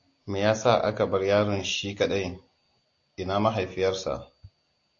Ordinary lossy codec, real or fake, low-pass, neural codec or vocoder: AAC, 48 kbps; real; 7.2 kHz; none